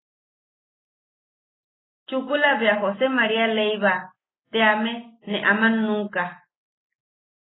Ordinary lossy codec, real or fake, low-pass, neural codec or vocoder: AAC, 16 kbps; real; 7.2 kHz; none